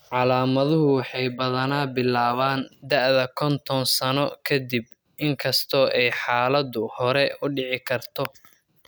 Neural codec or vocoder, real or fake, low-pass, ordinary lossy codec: none; real; none; none